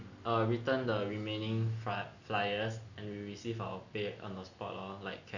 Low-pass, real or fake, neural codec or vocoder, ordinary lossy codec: 7.2 kHz; real; none; none